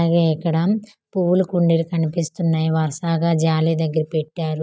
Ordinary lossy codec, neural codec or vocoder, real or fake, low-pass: none; none; real; none